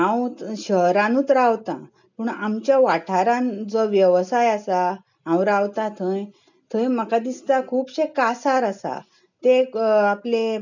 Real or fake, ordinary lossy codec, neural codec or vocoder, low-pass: real; none; none; 7.2 kHz